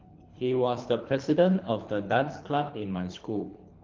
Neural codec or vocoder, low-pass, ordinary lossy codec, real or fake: codec, 24 kHz, 3 kbps, HILCodec; 7.2 kHz; Opus, 32 kbps; fake